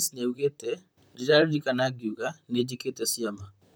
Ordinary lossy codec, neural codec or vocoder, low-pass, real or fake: none; vocoder, 44.1 kHz, 128 mel bands, Pupu-Vocoder; none; fake